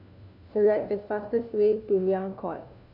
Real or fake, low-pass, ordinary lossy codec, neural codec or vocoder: fake; 5.4 kHz; none; codec, 16 kHz, 1 kbps, FunCodec, trained on LibriTTS, 50 frames a second